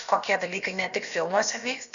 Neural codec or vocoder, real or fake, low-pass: codec, 16 kHz, about 1 kbps, DyCAST, with the encoder's durations; fake; 7.2 kHz